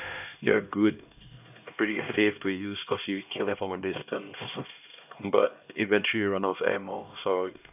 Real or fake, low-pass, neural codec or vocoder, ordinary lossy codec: fake; 3.6 kHz; codec, 16 kHz, 1 kbps, X-Codec, HuBERT features, trained on LibriSpeech; none